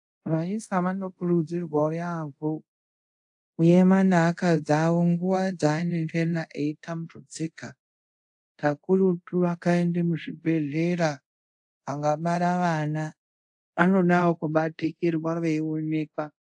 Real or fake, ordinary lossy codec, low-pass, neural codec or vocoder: fake; AAC, 64 kbps; 10.8 kHz; codec, 24 kHz, 0.5 kbps, DualCodec